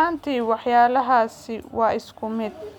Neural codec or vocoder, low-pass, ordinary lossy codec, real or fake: none; 19.8 kHz; none; real